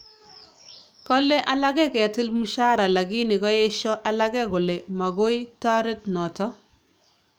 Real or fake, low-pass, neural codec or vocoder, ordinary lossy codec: fake; none; codec, 44.1 kHz, 7.8 kbps, DAC; none